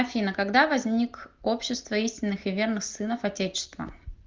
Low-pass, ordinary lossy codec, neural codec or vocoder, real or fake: 7.2 kHz; Opus, 32 kbps; none; real